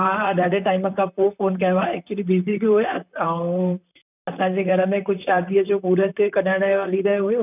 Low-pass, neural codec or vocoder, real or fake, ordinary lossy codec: 3.6 kHz; vocoder, 44.1 kHz, 128 mel bands, Pupu-Vocoder; fake; none